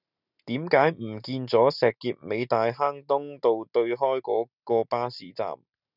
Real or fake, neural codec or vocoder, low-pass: real; none; 5.4 kHz